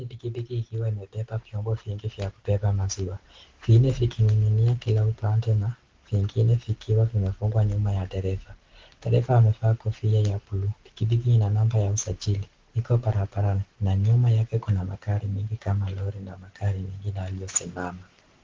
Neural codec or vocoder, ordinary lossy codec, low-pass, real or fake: none; Opus, 16 kbps; 7.2 kHz; real